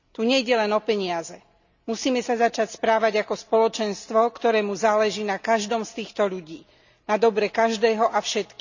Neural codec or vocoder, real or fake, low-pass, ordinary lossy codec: none; real; 7.2 kHz; none